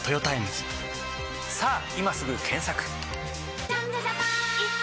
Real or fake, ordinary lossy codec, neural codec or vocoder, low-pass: real; none; none; none